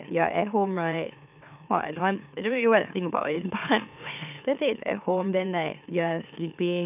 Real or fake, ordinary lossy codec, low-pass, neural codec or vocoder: fake; none; 3.6 kHz; autoencoder, 44.1 kHz, a latent of 192 numbers a frame, MeloTTS